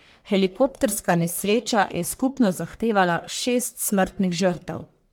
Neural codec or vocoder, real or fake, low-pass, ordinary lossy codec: codec, 44.1 kHz, 1.7 kbps, Pupu-Codec; fake; none; none